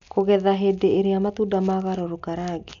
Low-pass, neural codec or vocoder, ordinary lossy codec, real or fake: 7.2 kHz; none; none; real